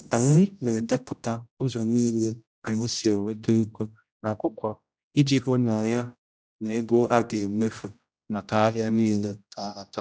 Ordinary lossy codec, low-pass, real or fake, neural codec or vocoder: none; none; fake; codec, 16 kHz, 0.5 kbps, X-Codec, HuBERT features, trained on general audio